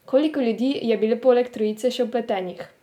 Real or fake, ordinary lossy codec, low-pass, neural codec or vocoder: real; none; 19.8 kHz; none